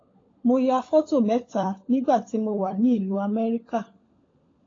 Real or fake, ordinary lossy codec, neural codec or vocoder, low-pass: fake; AAC, 32 kbps; codec, 16 kHz, 16 kbps, FunCodec, trained on LibriTTS, 50 frames a second; 7.2 kHz